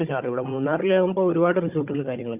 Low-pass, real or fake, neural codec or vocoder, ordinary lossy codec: 3.6 kHz; fake; codec, 16 kHz, 16 kbps, FunCodec, trained on Chinese and English, 50 frames a second; Opus, 64 kbps